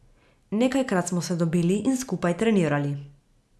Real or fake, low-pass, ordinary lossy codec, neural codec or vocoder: real; none; none; none